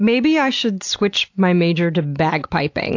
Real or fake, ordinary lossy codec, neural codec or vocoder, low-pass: real; AAC, 48 kbps; none; 7.2 kHz